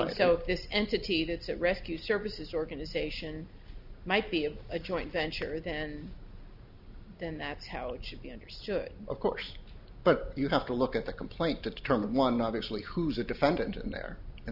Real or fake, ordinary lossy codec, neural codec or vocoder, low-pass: real; Opus, 64 kbps; none; 5.4 kHz